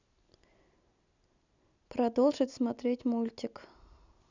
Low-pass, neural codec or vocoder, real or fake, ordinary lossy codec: 7.2 kHz; none; real; none